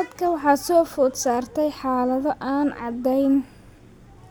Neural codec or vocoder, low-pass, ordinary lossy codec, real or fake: none; none; none; real